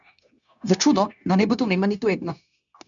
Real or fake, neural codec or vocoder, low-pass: fake; codec, 16 kHz, 0.9 kbps, LongCat-Audio-Codec; 7.2 kHz